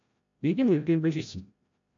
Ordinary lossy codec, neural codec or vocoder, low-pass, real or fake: MP3, 64 kbps; codec, 16 kHz, 0.5 kbps, FreqCodec, larger model; 7.2 kHz; fake